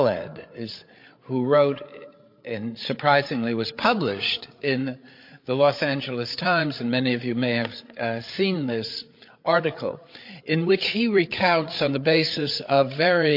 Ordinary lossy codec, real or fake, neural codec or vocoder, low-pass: MP3, 32 kbps; fake; codec, 16 kHz, 8 kbps, FreqCodec, larger model; 5.4 kHz